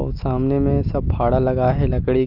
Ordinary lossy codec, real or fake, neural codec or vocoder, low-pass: Opus, 24 kbps; real; none; 5.4 kHz